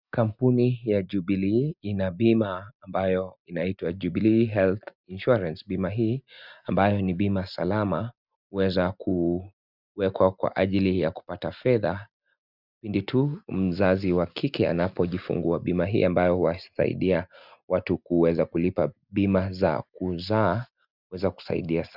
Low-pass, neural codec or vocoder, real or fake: 5.4 kHz; none; real